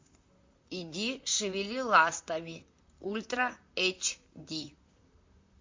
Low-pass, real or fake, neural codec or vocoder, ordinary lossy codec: 7.2 kHz; fake; vocoder, 44.1 kHz, 128 mel bands, Pupu-Vocoder; MP3, 48 kbps